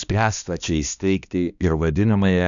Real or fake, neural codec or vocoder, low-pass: fake; codec, 16 kHz, 1 kbps, X-Codec, HuBERT features, trained on balanced general audio; 7.2 kHz